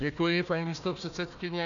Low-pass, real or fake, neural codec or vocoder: 7.2 kHz; fake; codec, 16 kHz, 1 kbps, FunCodec, trained on Chinese and English, 50 frames a second